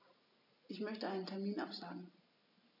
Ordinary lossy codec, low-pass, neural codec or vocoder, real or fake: none; 5.4 kHz; none; real